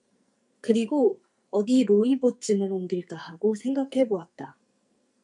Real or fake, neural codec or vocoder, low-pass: fake; codec, 44.1 kHz, 2.6 kbps, SNAC; 10.8 kHz